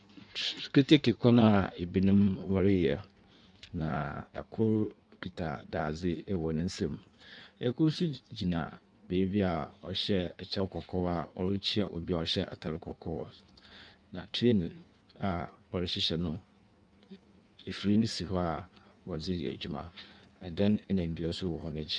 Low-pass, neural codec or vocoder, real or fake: 9.9 kHz; codec, 16 kHz in and 24 kHz out, 1.1 kbps, FireRedTTS-2 codec; fake